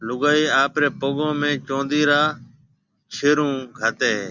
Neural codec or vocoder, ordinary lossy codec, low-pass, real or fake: none; Opus, 64 kbps; 7.2 kHz; real